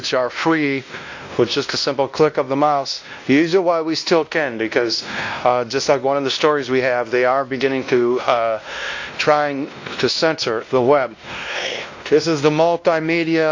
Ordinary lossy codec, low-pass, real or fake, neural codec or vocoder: AAC, 48 kbps; 7.2 kHz; fake; codec, 16 kHz, 1 kbps, X-Codec, WavLM features, trained on Multilingual LibriSpeech